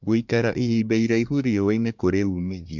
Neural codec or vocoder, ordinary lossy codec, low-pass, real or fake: codec, 24 kHz, 1 kbps, SNAC; MP3, 64 kbps; 7.2 kHz; fake